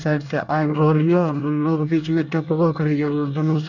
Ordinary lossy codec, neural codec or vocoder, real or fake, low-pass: none; codec, 24 kHz, 1 kbps, SNAC; fake; 7.2 kHz